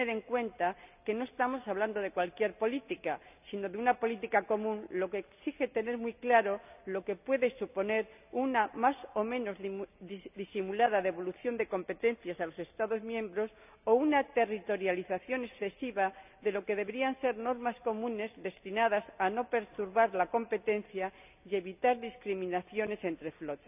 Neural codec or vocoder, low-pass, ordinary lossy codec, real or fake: none; 3.6 kHz; none; real